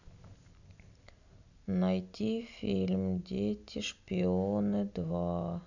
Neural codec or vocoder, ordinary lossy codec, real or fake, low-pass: none; none; real; 7.2 kHz